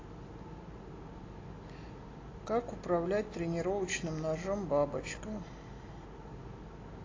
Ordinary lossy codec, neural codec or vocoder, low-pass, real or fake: AAC, 32 kbps; none; 7.2 kHz; real